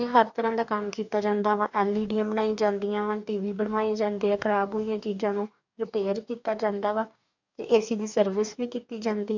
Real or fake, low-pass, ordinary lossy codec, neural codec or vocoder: fake; 7.2 kHz; none; codec, 44.1 kHz, 2.6 kbps, DAC